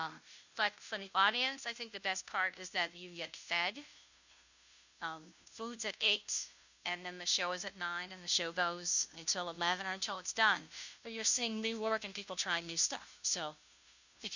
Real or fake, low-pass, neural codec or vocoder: fake; 7.2 kHz; codec, 16 kHz, 0.5 kbps, FunCodec, trained on Chinese and English, 25 frames a second